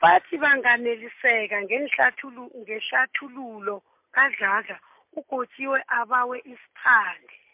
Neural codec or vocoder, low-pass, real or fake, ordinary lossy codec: none; 3.6 kHz; real; MP3, 32 kbps